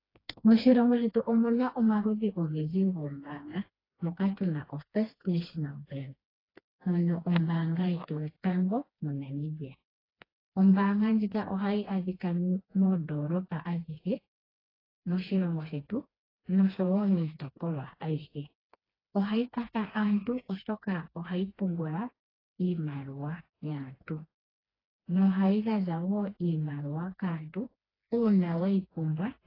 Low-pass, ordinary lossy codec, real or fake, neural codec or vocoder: 5.4 kHz; AAC, 24 kbps; fake; codec, 16 kHz, 2 kbps, FreqCodec, smaller model